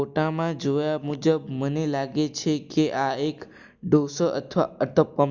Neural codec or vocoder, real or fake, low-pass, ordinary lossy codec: none; real; 7.2 kHz; Opus, 64 kbps